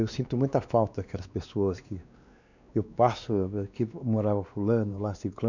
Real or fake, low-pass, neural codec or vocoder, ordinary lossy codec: fake; 7.2 kHz; codec, 16 kHz, 2 kbps, X-Codec, WavLM features, trained on Multilingual LibriSpeech; none